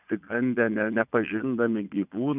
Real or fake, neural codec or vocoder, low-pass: fake; vocoder, 22.05 kHz, 80 mel bands, Vocos; 3.6 kHz